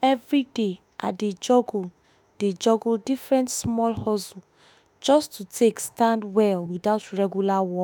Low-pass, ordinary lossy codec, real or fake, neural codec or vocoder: none; none; fake; autoencoder, 48 kHz, 32 numbers a frame, DAC-VAE, trained on Japanese speech